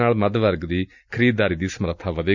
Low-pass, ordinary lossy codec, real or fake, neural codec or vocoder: 7.2 kHz; none; real; none